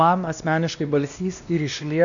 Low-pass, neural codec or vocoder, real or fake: 7.2 kHz; codec, 16 kHz, 1 kbps, X-Codec, WavLM features, trained on Multilingual LibriSpeech; fake